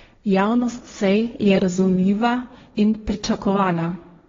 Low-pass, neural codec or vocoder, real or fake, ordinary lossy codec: 7.2 kHz; codec, 16 kHz, 1.1 kbps, Voila-Tokenizer; fake; AAC, 24 kbps